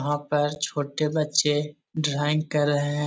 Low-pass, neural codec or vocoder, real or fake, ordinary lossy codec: none; none; real; none